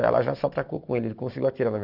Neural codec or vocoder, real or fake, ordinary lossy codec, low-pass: none; real; none; 5.4 kHz